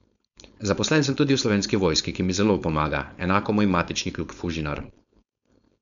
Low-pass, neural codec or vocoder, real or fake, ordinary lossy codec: 7.2 kHz; codec, 16 kHz, 4.8 kbps, FACodec; fake; none